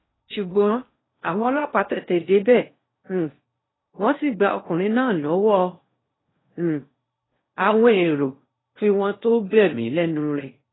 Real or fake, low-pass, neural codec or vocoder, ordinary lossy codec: fake; 7.2 kHz; codec, 16 kHz in and 24 kHz out, 0.8 kbps, FocalCodec, streaming, 65536 codes; AAC, 16 kbps